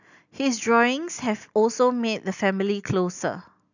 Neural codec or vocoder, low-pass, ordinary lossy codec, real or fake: none; 7.2 kHz; none; real